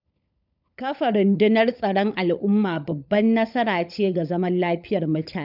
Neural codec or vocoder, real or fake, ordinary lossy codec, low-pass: codec, 16 kHz, 16 kbps, FunCodec, trained on LibriTTS, 50 frames a second; fake; none; 5.4 kHz